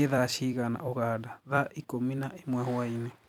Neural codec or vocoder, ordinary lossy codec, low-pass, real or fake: vocoder, 44.1 kHz, 128 mel bands every 256 samples, BigVGAN v2; none; 19.8 kHz; fake